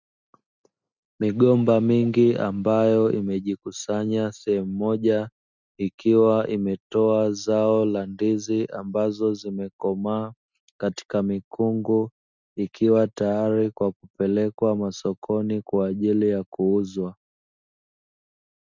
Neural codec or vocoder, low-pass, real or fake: none; 7.2 kHz; real